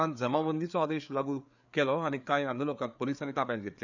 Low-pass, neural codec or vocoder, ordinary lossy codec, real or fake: 7.2 kHz; codec, 16 kHz, 4 kbps, FreqCodec, larger model; none; fake